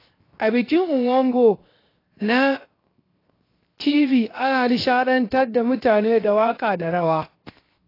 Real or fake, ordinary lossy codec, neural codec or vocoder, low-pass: fake; AAC, 24 kbps; codec, 16 kHz, 0.7 kbps, FocalCodec; 5.4 kHz